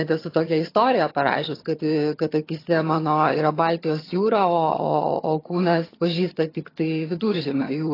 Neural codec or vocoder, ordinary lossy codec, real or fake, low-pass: vocoder, 22.05 kHz, 80 mel bands, HiFi-GAN; AAC, 24 kbps; fake; 5.4 kHz